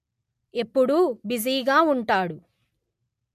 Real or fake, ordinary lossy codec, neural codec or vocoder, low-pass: real; MP3, 64 kbps; none; 14.4 kHz